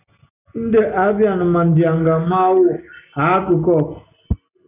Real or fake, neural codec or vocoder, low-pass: real; none; 3.6 kHz